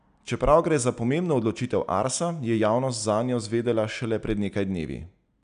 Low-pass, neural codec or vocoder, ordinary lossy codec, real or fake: 9.9 kHz; none; none; real